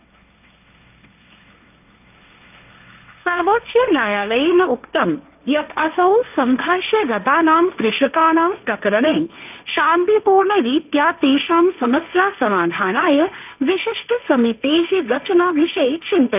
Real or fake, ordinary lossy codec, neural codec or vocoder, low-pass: fake; none; codec, 16 kHz, 1.1 kbps, Voila-Tokenizer; 3.6 kHz